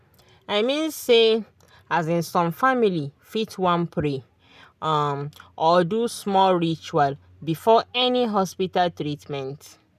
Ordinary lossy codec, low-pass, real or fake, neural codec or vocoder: none; 14.4 kHz; real; none